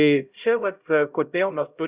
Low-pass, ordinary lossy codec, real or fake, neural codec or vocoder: 3.6 kHz; Opus, 64 kbps; fake; codec, 16 kHz, 0.5 kbps, X-Codec, HuBERT features, trained on LibriSpeech